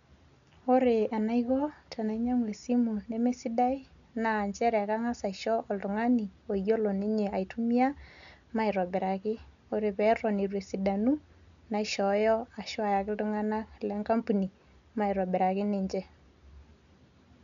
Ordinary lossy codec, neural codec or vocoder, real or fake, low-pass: none; none; real; 7.2 kHz